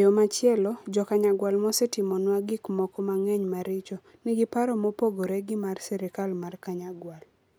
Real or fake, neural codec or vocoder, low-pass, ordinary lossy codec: real; none; none; none